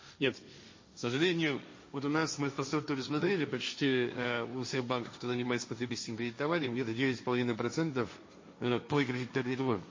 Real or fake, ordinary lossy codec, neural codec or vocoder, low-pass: fake; MP3, 32 kbps; codec, 16 kHz, 1.1 kbps, Voila-Tokenizer; 7.2 kHz